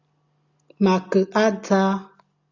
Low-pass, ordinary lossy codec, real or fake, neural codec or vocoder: 7.2 kHz; Opus, 64 kbps; real; none